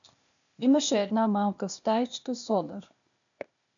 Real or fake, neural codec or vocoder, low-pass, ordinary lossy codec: fake; codec, 16 kHz, 0.8 kbps, ZipCodec; 7.2 kHz; MP3, 96 kbps